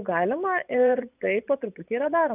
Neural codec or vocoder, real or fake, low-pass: vocoder, 24 kHz, 100 mel bands, Vocos; fake; 3.6 kHz